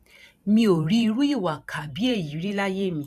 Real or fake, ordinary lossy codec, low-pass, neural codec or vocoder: fake; none; 14.4 kHz; vocoder, 44.1 kHz, 128 mel bands every 512 samples, BigVGAN v2